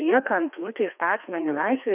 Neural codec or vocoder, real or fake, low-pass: codec, 16 kHz, 2 kbps, FreqCodec, larger model; fake; 3.6 kHz